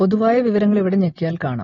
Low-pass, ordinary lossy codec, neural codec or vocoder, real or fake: 7.2 kHz; AAC, 32 kbps; none; real